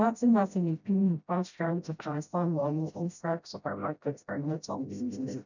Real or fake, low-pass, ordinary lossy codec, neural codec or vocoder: fake; 7.2 kHz; none; codec, 16 kHz, 0.5 kbps, FreqCodec, smaller model